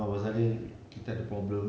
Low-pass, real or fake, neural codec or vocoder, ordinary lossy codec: none; real; none; none